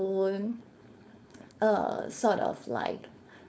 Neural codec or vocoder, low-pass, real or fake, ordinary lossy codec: codec, 16 kHz, 4.8 kbps, FACodec; none; fake; none